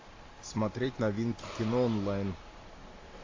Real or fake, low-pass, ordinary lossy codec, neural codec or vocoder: real; 7.2 kHz; AAC, 32 kbps; none